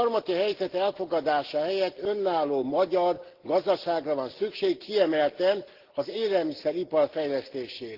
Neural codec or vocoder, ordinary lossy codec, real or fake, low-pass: none; Opus, 16 kbps; real; 5.4 kHz